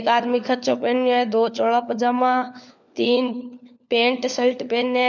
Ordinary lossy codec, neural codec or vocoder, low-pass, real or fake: none; codec, 16 kHz, 4 kbps, FunCodec, trained on LibriTTS, 50 frames a second; 7.2 kHz; fake